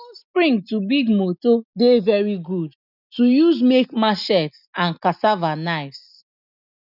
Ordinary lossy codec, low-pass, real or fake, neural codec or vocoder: none; 5.4 kHz; real; none